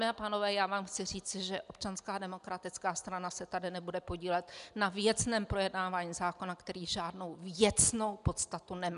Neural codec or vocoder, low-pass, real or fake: none; 10.8 kHz; real